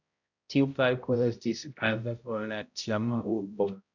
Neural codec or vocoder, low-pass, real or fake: codec, 16 kHz, 0.5 kbps, X-Codec, HuBERT features, trained on balanced general audio; 7.2 kHz; fake